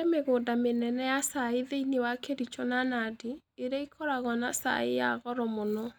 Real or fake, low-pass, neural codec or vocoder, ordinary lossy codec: real; none; none; none